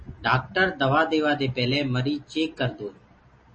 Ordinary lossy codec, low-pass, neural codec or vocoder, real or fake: MP3, 32 kbps; 10.8 kHz; none; real